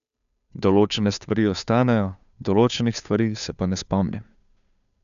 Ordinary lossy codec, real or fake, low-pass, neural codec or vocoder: none; fake; 7.2 kHz; codec, 16 kHz, 2 kbps, FunCodec, trained on Chinese and English, 25 frames a second